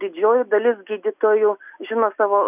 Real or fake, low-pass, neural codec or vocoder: real; 3.6 kHz; none